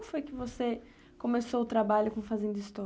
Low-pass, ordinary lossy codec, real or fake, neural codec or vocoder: none; none; real; none